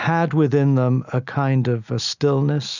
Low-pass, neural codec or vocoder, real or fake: 7.2 kHz; none; real